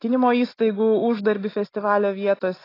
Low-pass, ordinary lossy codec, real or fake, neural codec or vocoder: 5.4 kHz; AAC, 24 kbps; real; none